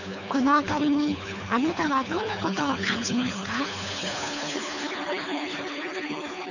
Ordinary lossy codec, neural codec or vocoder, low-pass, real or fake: none; codec, 24 kHz, 3 kbps, HILCodec; 7.2 kHz; fake